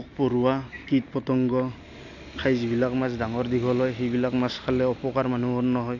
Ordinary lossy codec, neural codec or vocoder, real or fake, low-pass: none; none; real; 7.2 kHz